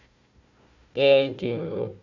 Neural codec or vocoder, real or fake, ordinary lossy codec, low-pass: codec, 16 kHz, 1 kbps, FunCodec, trained on Chinese and English, 50 frames a second; fake; MP3, 64 kbps; 7.2 kHz